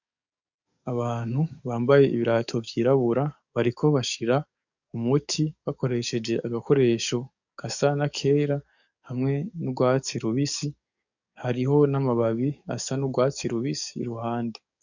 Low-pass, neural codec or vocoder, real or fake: 7.2 kHz; codec, 44.1 kHz, 7.8 kbps, DAC; fake